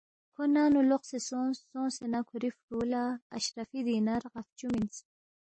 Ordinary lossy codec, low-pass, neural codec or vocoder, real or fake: MP3, 32 kbps; 9.9 kHz; none; real